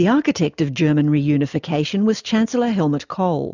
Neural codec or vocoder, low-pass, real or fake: none; 7.2 kHz; real